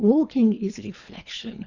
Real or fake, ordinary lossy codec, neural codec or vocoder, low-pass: fake; Opus, 64 kbps; codec, 24 kHz, 3 kbps, HILCodec; 7.2 kHz